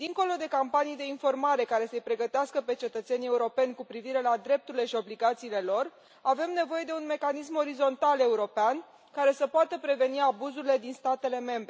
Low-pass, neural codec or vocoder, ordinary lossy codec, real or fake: none; none; none; real